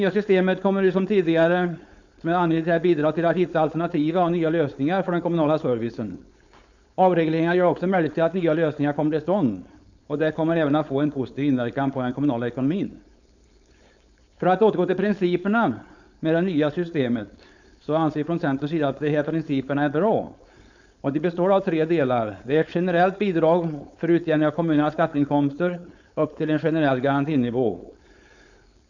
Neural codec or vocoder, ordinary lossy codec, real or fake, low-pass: codec, 16 kHz, 4.8 kbps, FACodec; none; fake; 7.2 kHz